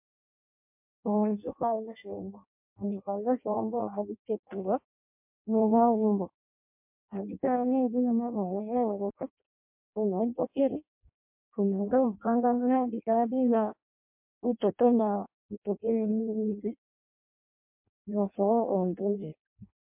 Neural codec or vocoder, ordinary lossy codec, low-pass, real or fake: codec, 16 kHz in and 24 kHz out, 0.6 kbps, FireRedTTS-2 codec; MP3, 32 kbps; 3.6 kHz; fake